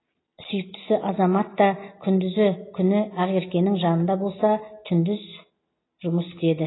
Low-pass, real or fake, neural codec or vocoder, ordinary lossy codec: 7.2 kHz; real; none; AAC, 16 kbps